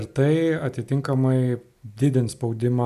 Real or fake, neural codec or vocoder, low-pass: real; none; 14.4 kHz